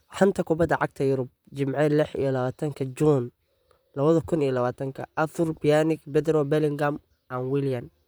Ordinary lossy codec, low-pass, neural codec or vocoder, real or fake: none; none; vocoder, 44.1 kHz, 128 mel bands, Pupu-Vocoder; fake